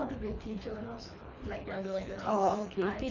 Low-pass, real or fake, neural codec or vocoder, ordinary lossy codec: 7.2 kHz; fake; codec, 24 kHz, 3 kbps, HILCodec; none